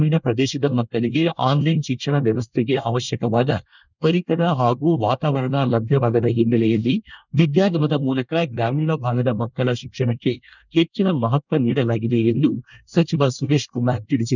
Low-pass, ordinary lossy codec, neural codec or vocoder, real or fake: 7.2 kHz; none; codec, 24 kHz, 1 kbps, SNAC; fake